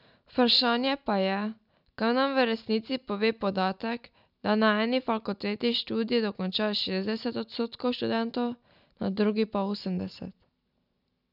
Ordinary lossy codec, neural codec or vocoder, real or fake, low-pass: none; none; real; 5.4 kHz